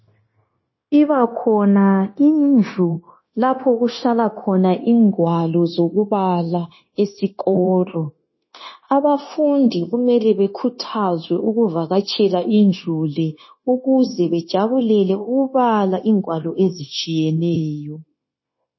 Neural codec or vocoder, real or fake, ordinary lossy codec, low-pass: codec, 16 kHz, 0.9 kbps, LongCat-Audio-Codec; fake; MP3, 24 kbps; 7.2 kHz